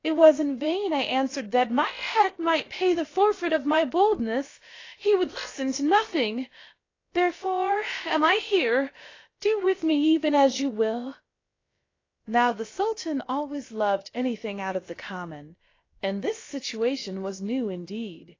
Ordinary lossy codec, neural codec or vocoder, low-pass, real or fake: AAC, 32 kbps; codec, 16 kHz, about 1 kbps, DyCAST, with the encoder's durations; 7.2 kHz; fake